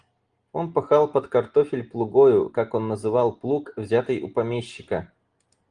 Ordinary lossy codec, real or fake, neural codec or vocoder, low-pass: Opus, 24 kbps; real; none; 9.9 kHz